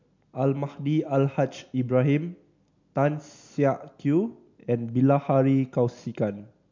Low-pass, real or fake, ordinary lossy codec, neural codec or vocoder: 7.2 kHz; real; MP3, 64 kbps; none